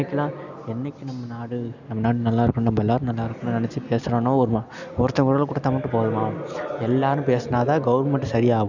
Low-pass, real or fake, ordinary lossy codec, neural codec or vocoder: 7.2 kHz; real; none; none